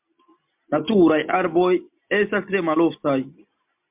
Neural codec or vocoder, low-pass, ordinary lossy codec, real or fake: none; 3.6 kHz; MP3, 32 kbps; real